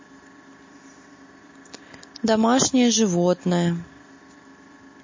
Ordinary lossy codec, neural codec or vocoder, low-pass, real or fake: MP3, 32 kbps; none; 7.2 kHz; real